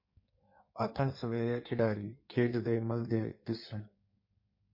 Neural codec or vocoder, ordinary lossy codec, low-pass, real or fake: codec, 16 kHz in and 24 kHz out, 1.1 kbps, FireRedTTS-2 codec; MP3, 32 kbps; 5.4 kHz; fake